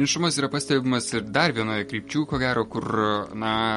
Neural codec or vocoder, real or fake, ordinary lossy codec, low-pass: none; real; MP3, 48 kbps; 19.8 kHz